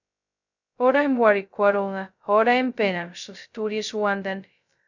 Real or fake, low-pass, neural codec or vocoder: fake; 7.2 kHz; codec, 16 kHz, 0.2 kbps, FocalCodec